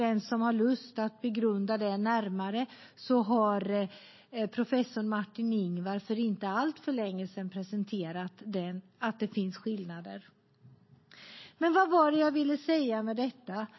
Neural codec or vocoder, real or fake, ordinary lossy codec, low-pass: none; real; MP3, 24 kbps; 7.2 kHz